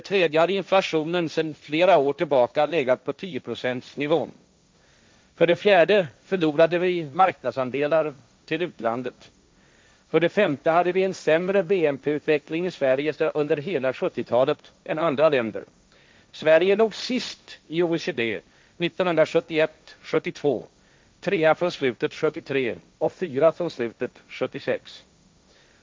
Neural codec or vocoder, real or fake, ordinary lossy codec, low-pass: codec, 16 kHz, 1.1 kbps, Voila-Tokenizer; fake; none; none